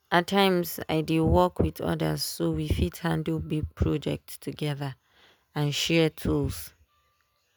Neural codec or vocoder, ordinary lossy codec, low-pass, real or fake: none; none; none; real